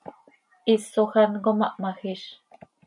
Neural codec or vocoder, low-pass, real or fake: none; 10.8 kHz; real